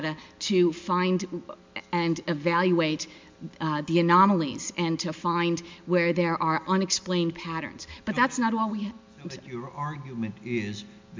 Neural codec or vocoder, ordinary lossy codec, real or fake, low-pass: none; MP3, 64 kbps; real; 7.2 kHz